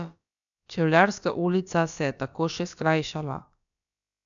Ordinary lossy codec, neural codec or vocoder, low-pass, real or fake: none; codec, 16 kHz, about 1 kbps, DyCAST, with the encoder's durations; 7.2 kHz; fake